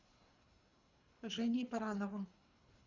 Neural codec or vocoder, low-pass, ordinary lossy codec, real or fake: codec, 24 kHz, 3 kbps, HILCodec; 7.2 kHz; Opus, 64 kbps; fake